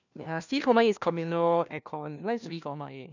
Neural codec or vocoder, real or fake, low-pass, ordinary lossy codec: codec, 16 kHz, 1 kbps, FunCodec, trained on LibriTTS, 50 frames a second; fake; 7.2 kHz; none